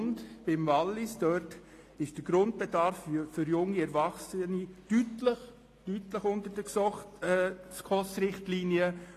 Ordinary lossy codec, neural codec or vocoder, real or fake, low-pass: AAC, 48 kbps; none; real; 14.4 kHz